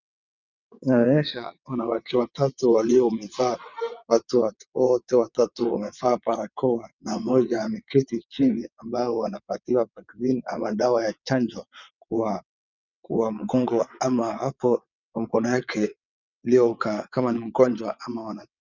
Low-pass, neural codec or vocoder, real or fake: 7.2 kHz; vocoder, 22.05 kHz, 80 mel bands, WaveNeXt; fake